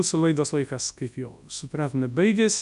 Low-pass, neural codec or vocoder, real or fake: 10.8 kHz; codec, 24 kHz, 0.9 kbps, WavTokenizer, large speech release; fake